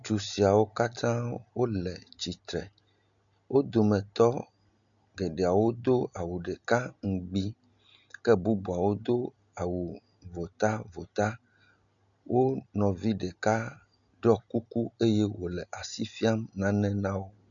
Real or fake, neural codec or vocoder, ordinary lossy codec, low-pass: real; none; MP3, 96 kbps; 7.2 kHz